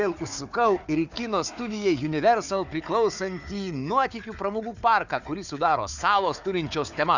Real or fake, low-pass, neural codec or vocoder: fake; 7.2 kHz; codec, 16 kHz, 4 kbps, FunCodec, trained on Chinese and English, 50 frames a second